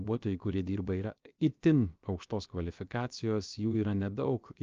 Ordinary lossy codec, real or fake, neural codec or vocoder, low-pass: Opus, 24 kbps; fake; codec, 16 kHz, 0.7 kbps, FocalCodec; 7.2 kHz